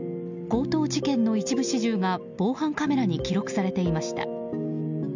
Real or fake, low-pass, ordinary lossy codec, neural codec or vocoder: real; 7.2 kHz; none; none